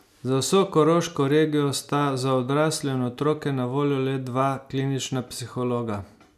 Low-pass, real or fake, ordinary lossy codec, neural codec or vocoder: 14.4 kHz; real; none; none